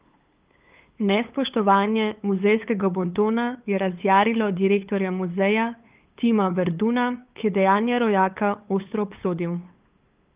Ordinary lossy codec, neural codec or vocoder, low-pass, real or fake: Opus, 32 kbps; codec, 16 kHz, 8 kbps, FunCodec, trained on LibriTTS, 25 frames a second; 3.6 kHz; fake